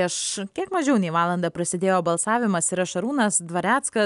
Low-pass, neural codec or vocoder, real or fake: 10.8 kHz; none; real